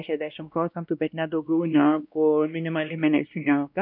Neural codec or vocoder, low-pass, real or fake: codec, 16 kHz, 1 kbps, X-Codec, WavLM features, trained on Multilingual LibriSpeech; 5.4 kHz; fake